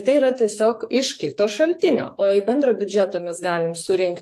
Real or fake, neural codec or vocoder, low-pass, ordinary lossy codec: fake; codec, 44.1 kHz, 2.6 kbps, SNAC; 14.4 kHz; AAC, 64 kbps